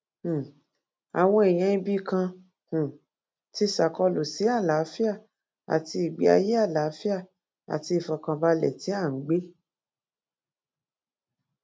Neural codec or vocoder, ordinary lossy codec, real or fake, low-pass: none; none; real; none